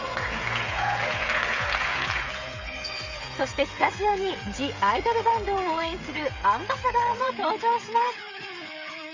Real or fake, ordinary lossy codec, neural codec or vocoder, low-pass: fake; MP3, 64 kbps; codec, 16 kHz, 16 kbps, FreqCodec, smaller model; 7.2 kHz